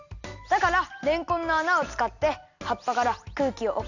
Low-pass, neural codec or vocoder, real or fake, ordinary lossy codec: 7.2 kHz; none; real; none